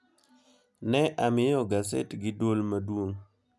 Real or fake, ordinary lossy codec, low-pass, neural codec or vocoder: real; none; none; none